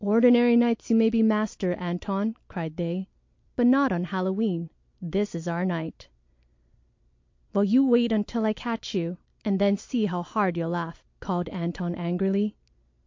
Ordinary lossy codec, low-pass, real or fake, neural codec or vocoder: MP3, 48 kbps; 7.2 kHz; real; none